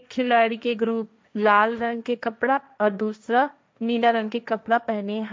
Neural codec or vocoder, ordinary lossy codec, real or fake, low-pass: codec, 16 kHz, 1.1 kbps, Voila-Tokenizer; none; fake; none